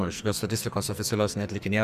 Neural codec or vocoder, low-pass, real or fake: codec, 44.1 kHz, 2.6 kbps, SNAC; 14.4 kHz; fake